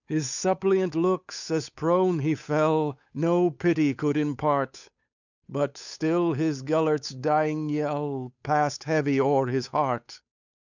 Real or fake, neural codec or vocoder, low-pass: fake; codec, 16 kHz, 8 kbps, FunCodec, trained on Chinese and English, 25 frames a second; 7.2 kHz